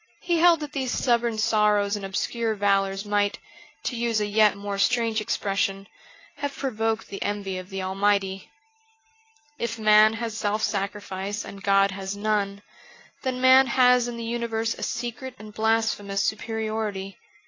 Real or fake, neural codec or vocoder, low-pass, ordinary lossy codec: real; none; 7.2 kHz; AAC, 32 kbps